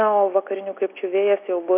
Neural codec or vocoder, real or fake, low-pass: none; real; 3.6 kHz